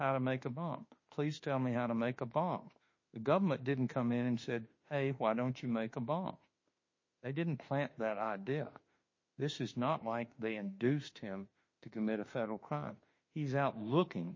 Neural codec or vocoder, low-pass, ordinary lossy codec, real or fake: autoencoder, 48 kHz, 32 numbers a frame, DAC-VAE, trained on Japanese speech; 7.2 kHz; MP3, 32 kbps; fake